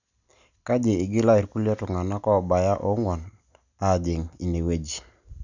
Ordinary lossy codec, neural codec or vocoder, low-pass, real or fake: none; none; 7.2 kHz; real